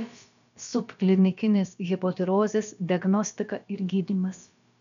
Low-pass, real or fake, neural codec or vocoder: 7.2 kHz; fake; codec, 16 kHz, about 1 kbps, DyCAST, with the encoder's durations